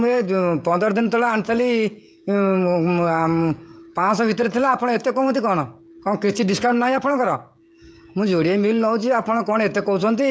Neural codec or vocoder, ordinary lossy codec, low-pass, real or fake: codec, 16 kHz, 16 kbps, FreqCodec, smaller model; none; none; fake